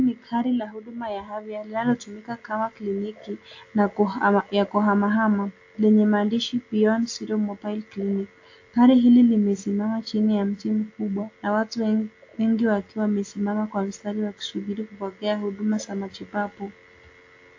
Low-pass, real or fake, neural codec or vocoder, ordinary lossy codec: 7.2 kHz; real; none; AAC, 48 kbps